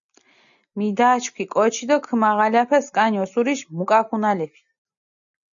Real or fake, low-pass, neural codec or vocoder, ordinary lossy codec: real; 7.2 kHz; none; MP3, 96 kbps